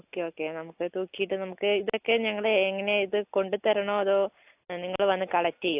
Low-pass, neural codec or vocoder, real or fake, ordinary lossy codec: 3.6 kHz; none; real; AAC, 32 kbps